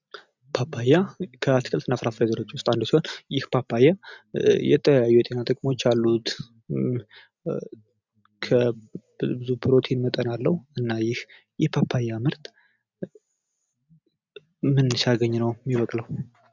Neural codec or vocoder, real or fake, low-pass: none; real; 7.2 kHz